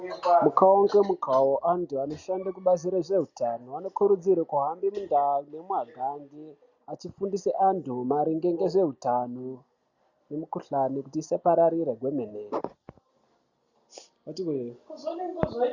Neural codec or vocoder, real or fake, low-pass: none; real; 7.2 kHz